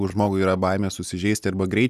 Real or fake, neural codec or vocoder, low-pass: real; none; 14.4 kHz